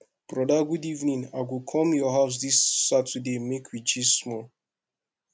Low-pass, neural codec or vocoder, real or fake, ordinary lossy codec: none; none; real; none